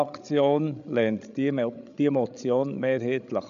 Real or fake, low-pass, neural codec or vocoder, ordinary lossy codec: fake; 7.2 kHz; codec, 16 kHz, 16 kbps, FreqCodec, larger model; none